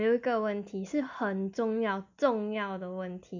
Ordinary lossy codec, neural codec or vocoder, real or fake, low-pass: none; none; real; 7.2 kHz